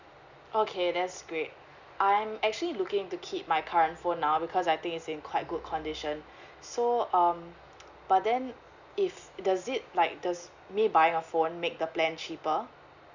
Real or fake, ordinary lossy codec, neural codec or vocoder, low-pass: real; Opus, 64 kbps; none; 7.2 kHz